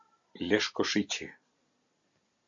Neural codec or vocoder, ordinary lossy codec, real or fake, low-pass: none; MP3, 64 kbps; real; 7.2 kHz